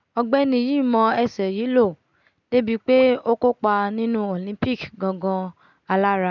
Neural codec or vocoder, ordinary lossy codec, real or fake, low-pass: none; none; real; 7.2 kHz